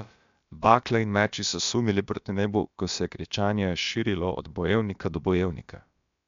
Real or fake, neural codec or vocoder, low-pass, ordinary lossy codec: fake; codec, 16 kHz, about 1 kbps, DyCAST, with the encoder's durations; 7.2 kHz; MP3, 64 kbps